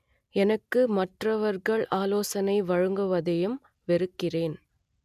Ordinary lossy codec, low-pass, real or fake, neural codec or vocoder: none; 14.4 kHz; real; none